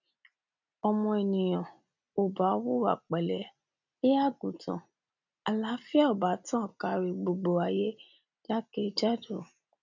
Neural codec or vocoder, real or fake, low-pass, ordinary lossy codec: none; real; 7.2 kHz; none